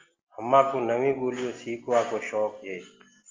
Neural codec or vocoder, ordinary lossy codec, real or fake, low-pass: none; Opus, 32 kbps; real; 7.2 kHz